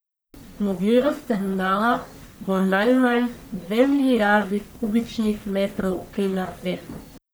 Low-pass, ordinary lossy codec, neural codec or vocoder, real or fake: none; none; codec, 44.1 kHz, 1.7 kbps, Pupu-Codec; fake